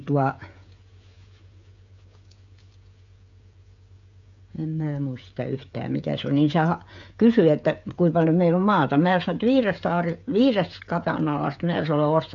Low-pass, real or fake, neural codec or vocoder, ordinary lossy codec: 7.2 kHz; fake; codec, 16 kHz, 16 kbps, FreqCodec, smaller model; MP3, 64 kbps